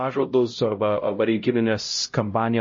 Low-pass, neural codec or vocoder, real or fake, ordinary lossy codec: 7.2 kHz; codec, 16 kHz, 0.5 kbps, X-Codec, HuBERT features, trained on LibriSpeech; fake; MP3, 32 kbps